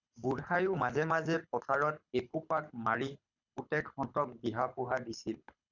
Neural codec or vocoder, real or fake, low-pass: codec, 24 kHz, 6 kbps, HILCodec; fake; 7.2 kHz